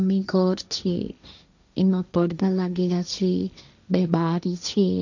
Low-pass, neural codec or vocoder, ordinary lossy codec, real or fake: 7.2 kHz; codec, 16 kHz, 1.1 kbps, Voila-Tokenizer; none; fake